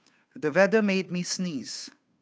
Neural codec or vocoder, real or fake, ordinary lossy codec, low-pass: codec, 16 kHz, 2 kbps, FunCodec, trained on Chinese and English, 25 frames a second; fake; none; none